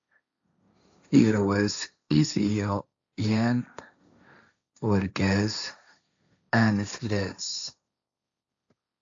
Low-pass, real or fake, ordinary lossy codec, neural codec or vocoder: 7.2 kHz; fake; AAC, 64 kbps; codec, 16 kHz, 1.1 kbps, Voila-Tokenizer